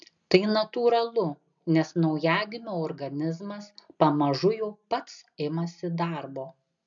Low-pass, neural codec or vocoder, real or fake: 7.2 kHz; none; real